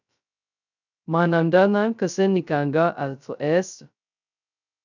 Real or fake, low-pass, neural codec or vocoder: fake; 7.2 kHz; codec, 16 kHz, 0.2 kbps, FocalCodec